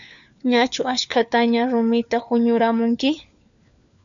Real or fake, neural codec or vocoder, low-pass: fake; codec, 16 kHz, 4 kbps, FunCodec, trained on LibriTTS, 50 frames a second; 7.2 kHz